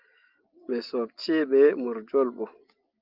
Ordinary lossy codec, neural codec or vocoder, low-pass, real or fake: Opus, 24 kbps; none; 5.4 kHz; real